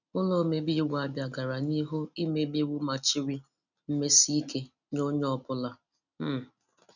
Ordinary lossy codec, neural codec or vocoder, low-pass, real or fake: none; none; 7.2 kHz; real